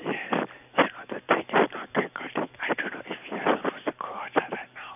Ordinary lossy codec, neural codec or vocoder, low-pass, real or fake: none; vocoder, 44.1 kHz, 128 mel bands every 256 samples, BigVGAN v2; 3.6 kHz; fake